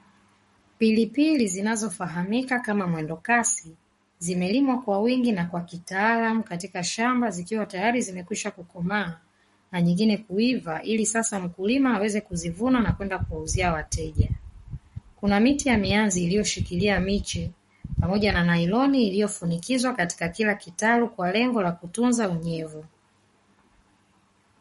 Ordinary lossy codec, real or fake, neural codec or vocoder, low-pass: MP3, 48 kbps; fake; codec, 44.1 kHz, 7.8 kbps, Pupu-Codec; 19.8 kHz